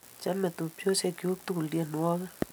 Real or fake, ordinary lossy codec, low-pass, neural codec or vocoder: real; none; none; none